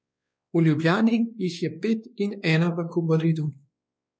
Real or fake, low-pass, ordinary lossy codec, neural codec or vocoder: fake; none; none; codec, 16 kHz, 2 kbps, X-Codec, WavLM features, trained on Multilingual LibriSpeech